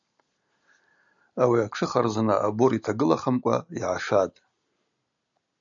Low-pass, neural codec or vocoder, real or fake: 7.2 kHz; none; real